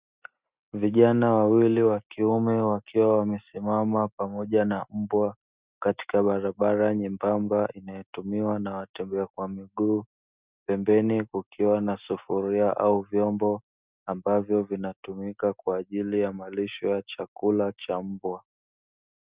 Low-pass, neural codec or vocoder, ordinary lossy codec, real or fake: 3.6 kHz; none; Opus, 64 kbps; real